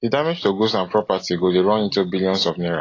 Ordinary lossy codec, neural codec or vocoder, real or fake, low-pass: AAC, 32 kbps; none; real; 7.2 kHz